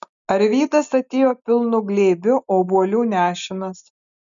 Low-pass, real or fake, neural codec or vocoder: 7.2 kHz; real; none